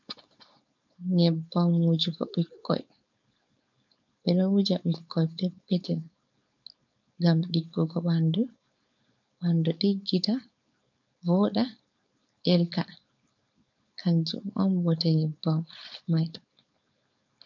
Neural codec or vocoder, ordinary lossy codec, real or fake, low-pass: codec, 16 kHz, 4.8 kbps, FACodec; MP3, 64 kbps; fake; 7.2 kHz